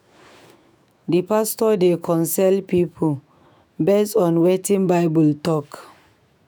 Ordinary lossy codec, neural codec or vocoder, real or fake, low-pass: none; autoencoder, 48 kHz, 128 numbers a frame, DAC-VAE, trained on Japanese speech; fake; none